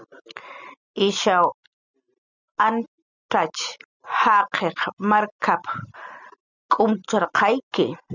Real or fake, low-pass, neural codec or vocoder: real; 7.2 kHz; none